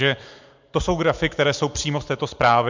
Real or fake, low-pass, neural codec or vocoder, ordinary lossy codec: real; 7.2 kHz; none; MP3, 64 kbps